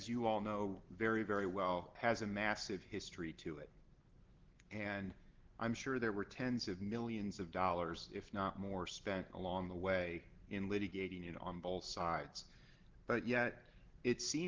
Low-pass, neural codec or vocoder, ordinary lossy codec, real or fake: 7.2 kHz; none; Opus, 16 kbps; real